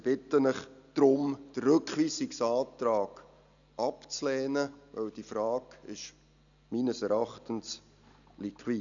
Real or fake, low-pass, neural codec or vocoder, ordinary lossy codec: real; 7.2 kHz; none; none